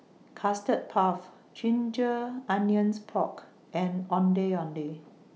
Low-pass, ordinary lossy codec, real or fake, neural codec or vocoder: none; none; real; none